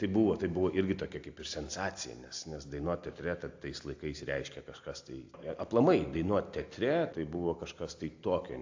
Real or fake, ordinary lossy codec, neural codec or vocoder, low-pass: real; AAC, 48 kbps; none; 7.2 kHz